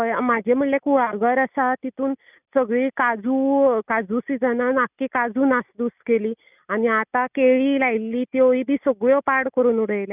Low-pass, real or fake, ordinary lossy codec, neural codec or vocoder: 3.6 kHz; real; none; none